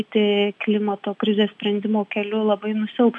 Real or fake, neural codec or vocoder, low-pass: real; none; 10.8 kHz